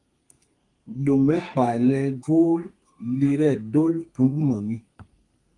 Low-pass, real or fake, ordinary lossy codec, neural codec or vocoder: 10.8 kHz; fake; Opus, 24 kbps; codec, 32 kHz, 1.9 kbps, SNAC